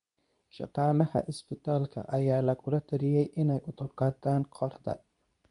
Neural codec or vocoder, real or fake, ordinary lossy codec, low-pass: codec, 24 kHz, 0.9 kbps, WavTokenizer, medium speech release version 2; fake; none; 10.8 kHz